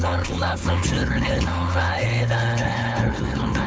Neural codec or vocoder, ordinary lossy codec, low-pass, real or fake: codec, 16 kHz, 4.8 kbps, FACodec; none; none; fake